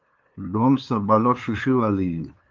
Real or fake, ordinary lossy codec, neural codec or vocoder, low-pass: fake; Opus, 32 kbps; codec, 16 kHz, 2 kbps, FunCodec, trained on LibriTTS, 25 frames a second; 7.2 kHz